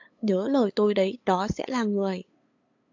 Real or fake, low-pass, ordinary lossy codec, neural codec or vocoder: fake; 7.2 kHz; AAC, 48 kbps; codec, 16 kHz, 8 kbps, FunCodec, trained on LibriTTS, 25 frames a second